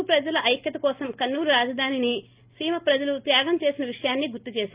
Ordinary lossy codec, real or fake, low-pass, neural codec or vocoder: Opus, 32 kbps; real; 3.6 kHz; none